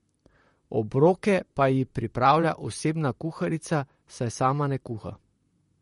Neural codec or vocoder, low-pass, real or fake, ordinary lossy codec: vocoder, 44.1 kHz, 128 mel bands, Pupu-Vocoder; 19.8 kHz; fake; MP3, 48 kbps